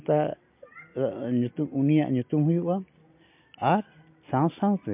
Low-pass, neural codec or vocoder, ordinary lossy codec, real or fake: 3.6 kHz; none; MP3, 32 kbps; real